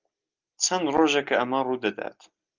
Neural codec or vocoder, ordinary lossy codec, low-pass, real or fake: none; Opus, 24 kbps; 7.2 kHz; real